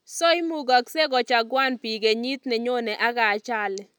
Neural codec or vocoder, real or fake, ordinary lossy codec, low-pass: none; real; none; none